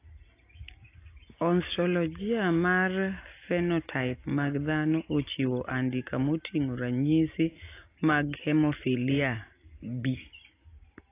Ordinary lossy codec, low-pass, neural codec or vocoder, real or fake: AAC, 24 kbps; 3.6 kHz; none; real